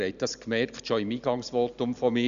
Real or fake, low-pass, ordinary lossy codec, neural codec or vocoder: real; 7.2 kHz; Opus, 64 kbps; none